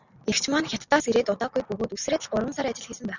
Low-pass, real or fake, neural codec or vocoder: 7.2 kHz; real; none